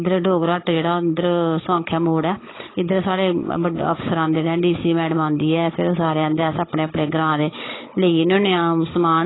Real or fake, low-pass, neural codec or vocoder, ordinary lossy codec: fake; 7.2 kHz; codec, 16 kHz, 16 kbps, FunCodec, trained on Chinese and English, 50 frames a second; AAC, 16 kbps